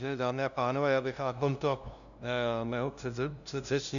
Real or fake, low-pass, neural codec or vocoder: fake; 7.2 kHz; codec, 16 kHz, 0.5 kbps, FunCodec, trained on LibriTTS, 25 frames a second